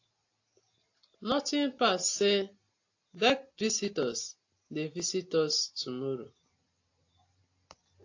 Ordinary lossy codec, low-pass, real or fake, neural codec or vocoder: AAC, 48 kbps; 7.2 kHz; real; none